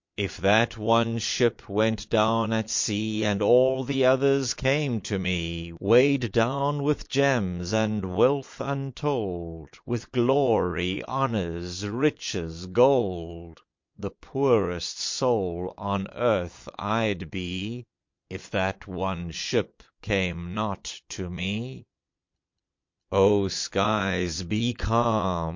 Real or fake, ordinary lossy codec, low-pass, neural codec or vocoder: fake; MP3, 48 kbps; 7.2 kHz; vocoder, 22.05 kHz, 80 mel bands, Vocos